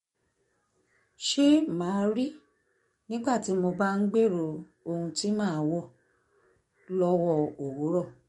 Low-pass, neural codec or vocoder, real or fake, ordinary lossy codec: 19.8 kHz; codec, 44.1 kHz, 7.8 kbps, DAC; fake; MP3, 48 kbps